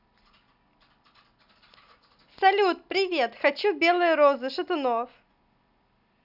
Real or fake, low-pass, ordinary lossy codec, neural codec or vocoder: real; 5.4 kHz; none; none